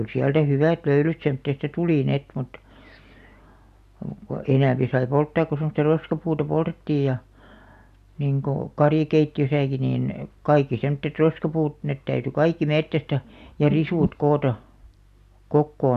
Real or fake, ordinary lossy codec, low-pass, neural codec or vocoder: real; none; 14.4 kHz; none